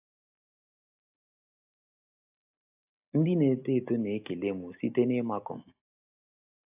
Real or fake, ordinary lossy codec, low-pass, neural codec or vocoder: real; AAC, 32 kbps; 3.6 kHz; none